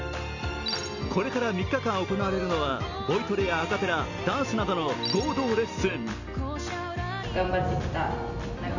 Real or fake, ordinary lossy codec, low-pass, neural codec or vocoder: real; none; 7.2 kHz; none